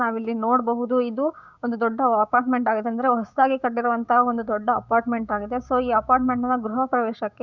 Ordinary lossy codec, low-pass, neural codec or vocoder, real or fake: Opus, 64 kbps; 7.2 kHz; codec, 16 kHz, 6 kbps, DAC; fake